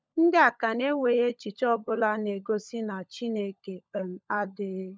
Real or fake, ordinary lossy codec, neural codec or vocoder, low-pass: fake; none; codec, 16 kHz, 16 kbps, FunCodec, trained on LibriTTS, 50 frames a second; none